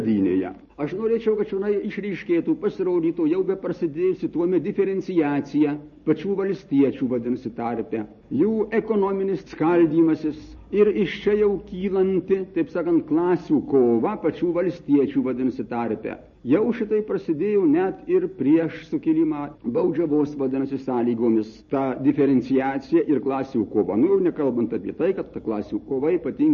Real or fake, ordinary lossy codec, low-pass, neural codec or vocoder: real; MP3, 32 kbps; 7.2 kHz; none